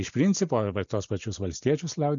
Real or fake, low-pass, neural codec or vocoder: real; 7.2 kHz; none